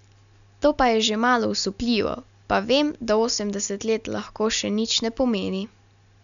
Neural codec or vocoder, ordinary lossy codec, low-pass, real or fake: none; MP3, 96 kbps; 7.2 kHz; real